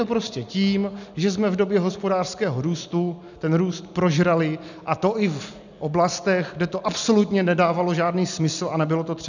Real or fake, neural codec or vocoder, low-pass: real; none; 7.2 kHz